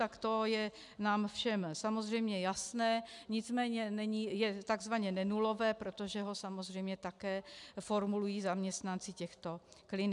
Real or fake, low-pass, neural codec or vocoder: real; 10.8 kHz; none